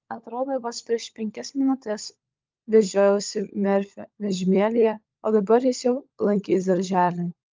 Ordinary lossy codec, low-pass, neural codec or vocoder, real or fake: Opus, 24 kbps; 7.2 kHz; codec, 16 kHz, 16 kbps, FunCodec, trained on LibriTTS, 50 frames a second; fake